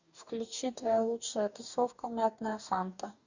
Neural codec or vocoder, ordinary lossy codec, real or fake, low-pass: codec, 44.1 kHz, 2.6 kbps, DAC; Opus, 64 kbps; fake; 7.2 kHz